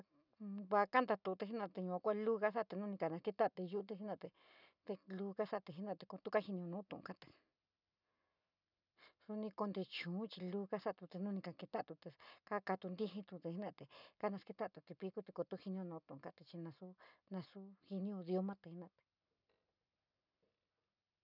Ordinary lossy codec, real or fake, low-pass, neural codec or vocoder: none; real; 5.4 kHz; none